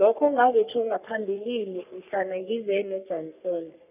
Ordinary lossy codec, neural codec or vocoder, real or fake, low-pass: none; codec, 44.1 kHz, 3.4 kbps, Pupu-Codec; fake; 3.6 kHz